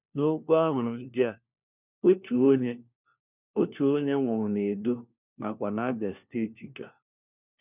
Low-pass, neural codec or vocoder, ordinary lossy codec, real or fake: 3.6 kHz; codec, 16 kHz, 1 kbps, FunCodec, trained on LibriTTS, 50 frames a second; none; fake